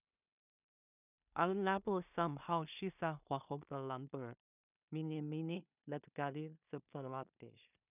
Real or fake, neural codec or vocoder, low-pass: fake; codec, 16 kHz in and 24 kHz out, 0.4 kbps, LongCat-Audio-Codec, two codebook decoder; 3.6 kHz